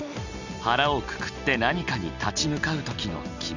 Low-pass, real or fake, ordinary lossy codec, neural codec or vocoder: 7.2 kHz; real; none; none